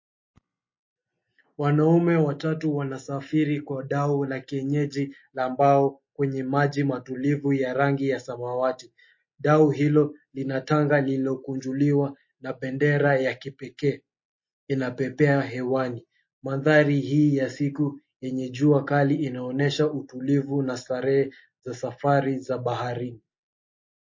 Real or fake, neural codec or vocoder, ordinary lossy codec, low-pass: real; none; MP3, 32 kbps; 7.2 kHz